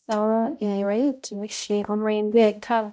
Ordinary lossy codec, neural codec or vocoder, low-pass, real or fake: none; codec, 16 kHz, 0.5 kbps, X-Codec, HuBERT features, trained on balanced general audio; none; fake